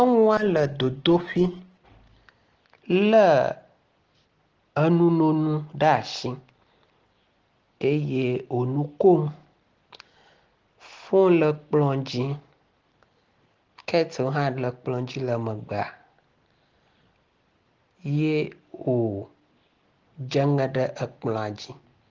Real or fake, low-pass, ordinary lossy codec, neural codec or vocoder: real; 7.2 kHz; Opus, 16 kbps; none